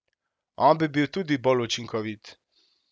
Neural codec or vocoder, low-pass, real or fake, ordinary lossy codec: none; none; real; none